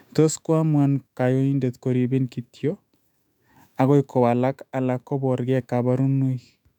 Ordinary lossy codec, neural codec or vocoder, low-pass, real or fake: none; autoencoder, 48 kHz, 128 numbers a frame, DAC-VAE, trained on Japanese speech; 19.8 kHz; fake